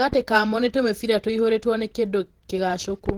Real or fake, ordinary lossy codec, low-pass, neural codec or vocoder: fake; Opus, 16 kbps; 19.8 kHz; vocoder, 48 kHz, 128 mel bands, Vocos